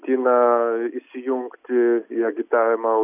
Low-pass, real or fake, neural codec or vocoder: 3.6 kHz; real; none